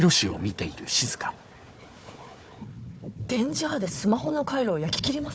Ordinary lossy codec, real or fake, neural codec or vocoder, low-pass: none; fake; codec, 16 kHz, 4 kbps, FunCodec, trained on Chinese and English, 50 frames a second; none